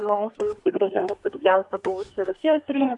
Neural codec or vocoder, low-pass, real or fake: codec, 24 kHz, 1 kbps, SNAC; 10.8 kHz; fake